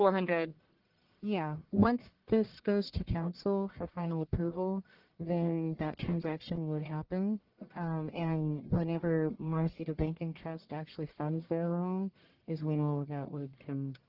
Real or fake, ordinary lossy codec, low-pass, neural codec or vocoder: fake; Opus, 16 kbps; 5.4 kHz; codec, 44.1 kHz, 1.7 kbps, Pupu-Codec